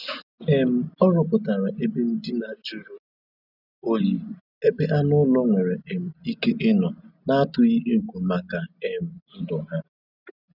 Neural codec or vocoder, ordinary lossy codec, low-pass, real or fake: none; none; 5.4 kHz; real